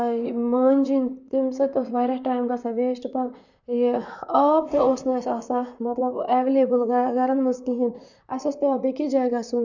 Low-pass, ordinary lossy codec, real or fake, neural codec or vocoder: 7.2 kHz; none; fake; vocoder, 44.1 kHz, 128 mel bands, Pupu-Vocoder